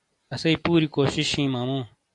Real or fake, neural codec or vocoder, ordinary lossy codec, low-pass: real; none; AAC, 64 kbps; 10.8 kHz